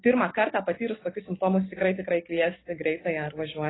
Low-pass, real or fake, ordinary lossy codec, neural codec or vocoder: 7.2 kHz; real; AAC, 16 kbps; none